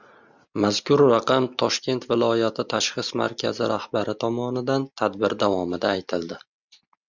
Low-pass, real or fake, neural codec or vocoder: 7.2 kHz; real; none